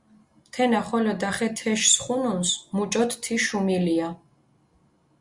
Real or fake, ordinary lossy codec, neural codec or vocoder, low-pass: real; Opus, 64 kbps; none; 10.8 kHz